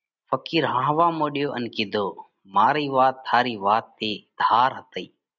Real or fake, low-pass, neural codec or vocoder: real; 7.2 kHz; none